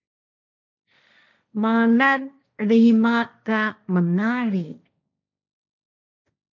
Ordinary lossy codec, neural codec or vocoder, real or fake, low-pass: MP3, 64 kbps; codec, 16 kHz, 1.1 kbps, Voila-Tokenizer; fake; 7.2 kHz